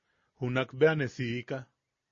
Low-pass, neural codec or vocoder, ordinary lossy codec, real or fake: 7.2 kHz; none; MP3, 32 kbps; real